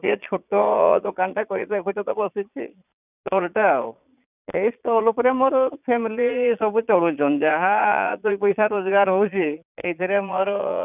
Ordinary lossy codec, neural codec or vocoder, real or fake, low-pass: none; vocoder, 22.05 kHz, 80 mel bands, Vocos; fake; 3.6 kHz